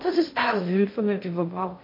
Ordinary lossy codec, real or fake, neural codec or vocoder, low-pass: MP3, 32 kbps; fake; codec, 16 kHz in and 24 kHz out, 0.6 kbps, FocalCodec, streaming, 2048 codes; 5.4 kHz